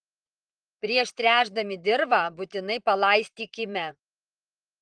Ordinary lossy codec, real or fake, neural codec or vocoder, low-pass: Opus, 24 kbps; fake; vocoder, 44.1 kHz, 128 mel bands, Pupu-Vocoder; 9.9 kHz